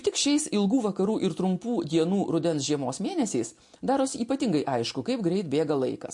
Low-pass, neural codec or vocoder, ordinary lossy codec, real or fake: 10.8 kHz; none; MP3, 48 kbps; real